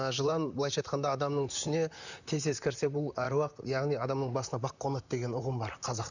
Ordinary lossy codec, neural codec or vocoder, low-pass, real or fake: none; vocoder, 44.1 kHz, 128 mel bands, Pupu-Vocoder; 7.2 kHz; fake